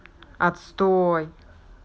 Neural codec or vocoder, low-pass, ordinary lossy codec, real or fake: none; none; none; real